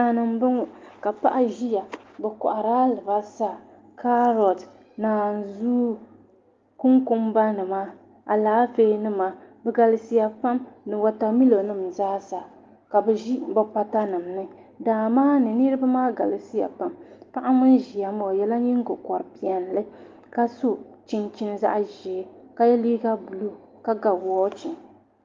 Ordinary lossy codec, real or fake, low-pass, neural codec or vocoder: Opus, 32 kbps; real; 7.2 kHz; none